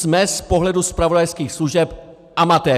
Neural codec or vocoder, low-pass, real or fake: none; 14.4 kHz; real